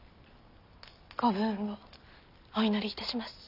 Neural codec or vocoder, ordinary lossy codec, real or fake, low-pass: none; none; real; 5.4 kHz